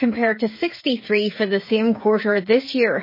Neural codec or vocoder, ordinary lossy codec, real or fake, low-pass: codec, 16 kHz, 8 kbps, FreqCodec, smaller model; MP3, 24 kbps; fake; 5.4 kHz